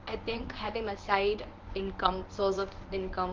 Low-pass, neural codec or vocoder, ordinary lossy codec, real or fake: 7.2 kHz; codec, 24 kHz, 0.9 kbps, WavTokenizer, medium speech release version 1; Opus, 24 kbps; fake